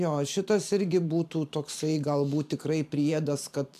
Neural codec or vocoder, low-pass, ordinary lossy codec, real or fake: vocoder, 44.1 kHz, 128 mel bands every 512 samples, BigVGAN v2; 14.4 kHz; AAC, 96 kbps; fake